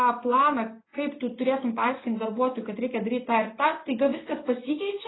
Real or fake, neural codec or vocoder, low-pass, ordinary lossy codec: real; none; 7.2 kHz; AAC, 16 kbps